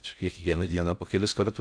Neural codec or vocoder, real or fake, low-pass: codec, 16 kHz in and 24 kHz out, 0.6 kbps, FocalCodec, streaming, 4096 codes; fake; 9.9 kHz